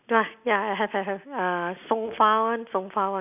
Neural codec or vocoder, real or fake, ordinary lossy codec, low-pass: none; real; none; 3.6 kHz